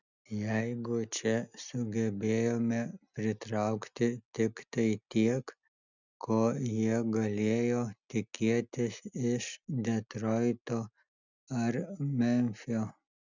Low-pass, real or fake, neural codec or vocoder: 7.2 kHz; real; none